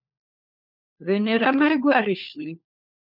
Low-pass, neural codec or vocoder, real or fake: 5.4 kHz; codec, 16 kHz, 4 kbps, FunCodec, trained on LibriTTS, 50 frames a second; fake